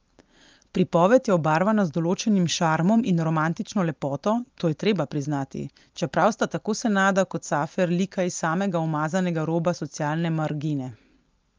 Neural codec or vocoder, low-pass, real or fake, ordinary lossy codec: none; 7.2 kHz; real; Opus, 24 kbps